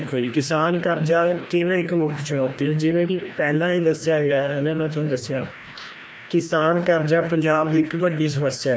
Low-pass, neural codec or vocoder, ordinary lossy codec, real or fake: none; codec, 16 kHz, 1 kbps, FreqCodec, larger model; none; fake